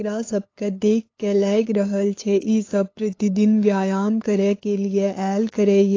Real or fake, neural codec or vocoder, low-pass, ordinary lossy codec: fake; codec, 16 kHz, 8 kbps, FunCodec, trained on LibriTTS, 25 frames a second; 7.2 kHz; AAC, 32 kbps